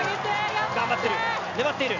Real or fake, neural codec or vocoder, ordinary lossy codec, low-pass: real; none; none; 7.2 kHz